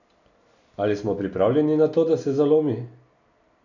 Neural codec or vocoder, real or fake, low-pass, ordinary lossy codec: none; real; 7.2 kHz; none